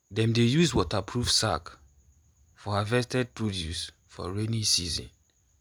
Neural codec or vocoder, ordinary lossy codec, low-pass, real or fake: none; none; none; real